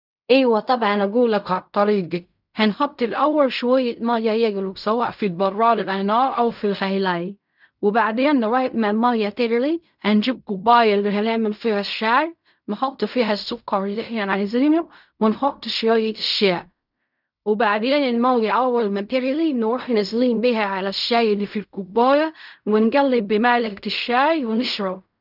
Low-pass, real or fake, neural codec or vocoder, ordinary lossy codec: 5.4 kHz; fake; codec, 16 kHz in and 24 kHz out, 0.4 kbps, LongCat-Audio-Codec, fine tuned four codebook decoder; none